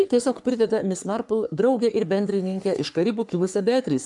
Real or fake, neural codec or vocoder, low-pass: fake; codec, 44.1 kHz, 3.4 kbps, Pupu-Codec; 10.8 kHz